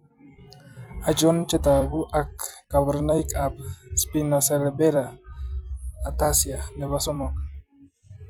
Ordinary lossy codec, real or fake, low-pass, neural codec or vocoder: none; fake; none; vocoder, 44.1 kHz, 128 mel bands every 256 samples, BigVGAN v2